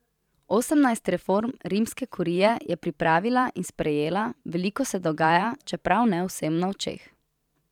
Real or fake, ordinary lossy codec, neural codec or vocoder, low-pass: fake; none; vocoder, 44.1 kHz, 128 mel bands every 512 samples, BigVGAN v2; 19.8 kHz